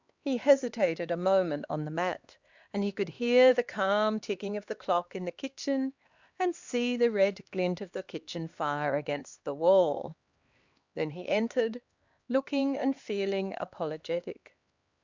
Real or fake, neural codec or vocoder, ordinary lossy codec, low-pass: fake; codec, 16 kHz, 2 kbps, X-Codec, HuBERT features, trained on LibriSpeech; Opus, 64 kbps; 7.2 kHz